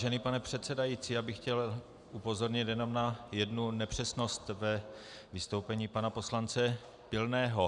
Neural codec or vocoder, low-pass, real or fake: none; 10.8 kHz; real